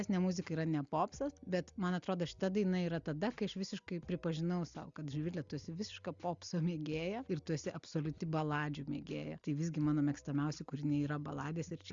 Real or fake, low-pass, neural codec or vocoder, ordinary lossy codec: real; 7.2 kHz; none; Opus, 64 kbps